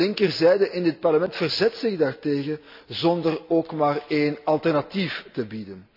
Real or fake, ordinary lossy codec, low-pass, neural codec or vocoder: real; none; 5.4 kHz; none